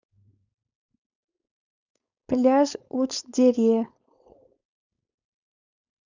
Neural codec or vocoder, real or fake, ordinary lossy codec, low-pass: codec, 16 kHz, 4.8 kbps, FACodec; fake; none; 7.2 kHz